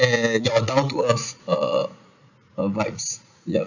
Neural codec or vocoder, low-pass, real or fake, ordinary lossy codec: vocoder, 44.1 kHz, 80 mel bands, Vocos; 7.2 kHz; fake; none